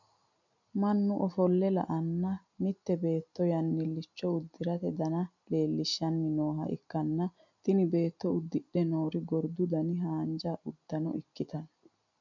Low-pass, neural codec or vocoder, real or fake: 7.2 kHz; none; real